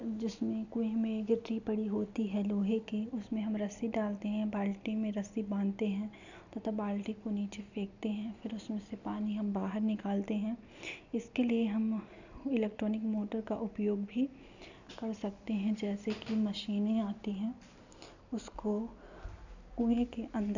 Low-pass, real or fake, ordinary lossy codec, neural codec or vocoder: 7.2 kHz; real; none; none